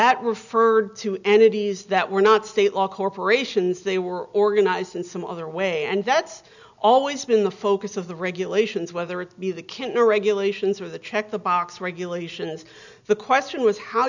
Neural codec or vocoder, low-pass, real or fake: none; 7.2 kHz; real